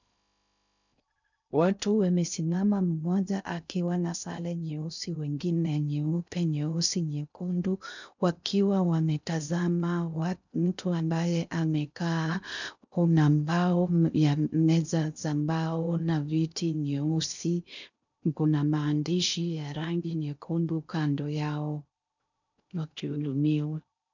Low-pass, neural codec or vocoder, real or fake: 7.2 kHz; codec, 16 kHz in and 24 kHz out, 0.6 kbps, FocalCodec, streaming, 2048 codes; fake